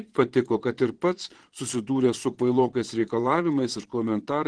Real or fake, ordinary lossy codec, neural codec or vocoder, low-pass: fake; Opus, 16 kbps; vocoder, 22.05 kHz, 80 mel bands, Vocos; 9.9 kHz